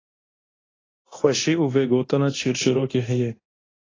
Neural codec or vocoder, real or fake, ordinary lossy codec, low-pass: codec, 24 kHz, 0.9 kbps, DualCodec; fake; AAC, 32 kbps; 7.2 kHz